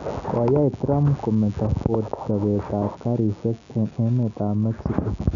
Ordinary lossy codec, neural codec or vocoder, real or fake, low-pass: none; none; real; 7.2 kHz